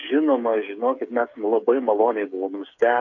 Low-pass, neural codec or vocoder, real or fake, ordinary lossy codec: 7.2 kHz; codec, 16 kHz, 8 kbps, FreqCodec, smaller model; fake; MP3, 64 kbps